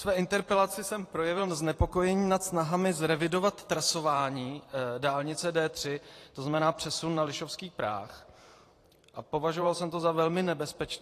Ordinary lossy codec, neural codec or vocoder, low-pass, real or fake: AAC, 48 kbps; vocoder, 44.1 kHz, 128 mel bands every 512 samples, BigVGAN v2; 14.4 kHz; fake